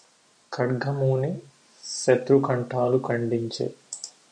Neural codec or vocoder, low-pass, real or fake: none; 9.9 kHz; real